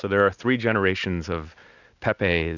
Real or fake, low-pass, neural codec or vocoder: real; 7.2 kHz; none